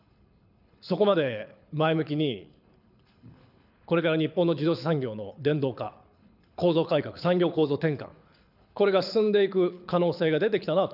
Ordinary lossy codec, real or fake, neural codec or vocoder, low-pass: none; fake; codec, 24 kHz, 6 kbps, HILCodec; 5.4 kHz